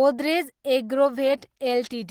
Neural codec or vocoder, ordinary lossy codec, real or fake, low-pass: none; Opus, 16 kbps; real; 19.8 kHz